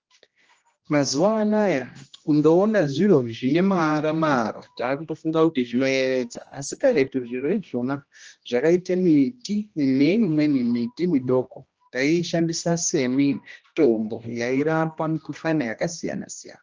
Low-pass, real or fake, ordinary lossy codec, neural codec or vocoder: 7.2 kHz; fake; Opus, 24 kbps; codec, 16 kHz, 1 kbps, X-Codec, HuBERT features, trained on general audio